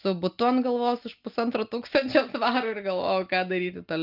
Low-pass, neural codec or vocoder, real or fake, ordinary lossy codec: 5.4 kHz; none; real; Opus, 24 kbps